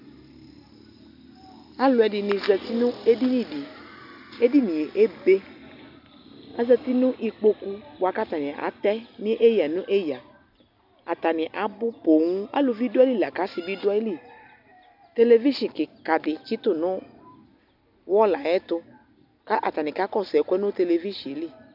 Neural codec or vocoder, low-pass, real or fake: none; 5.4 kHz; real